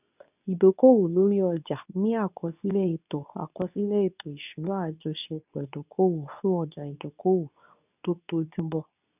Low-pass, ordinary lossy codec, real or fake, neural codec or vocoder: 3.6 kHz; none; fake; codec, 24 kHz, 0.9 kbps, WavTokenizer, medium speech release version 2